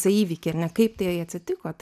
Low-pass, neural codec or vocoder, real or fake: 14.4 kHz; none; real